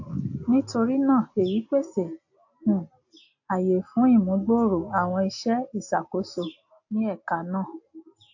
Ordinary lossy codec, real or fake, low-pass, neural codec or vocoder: AAC, 48 kbps; real; 7.2 kHz; none